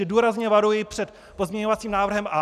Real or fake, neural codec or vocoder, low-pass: real; none; 14.4 kHz